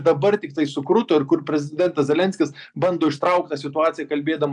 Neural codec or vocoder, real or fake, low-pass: none; real; 10.8 kHz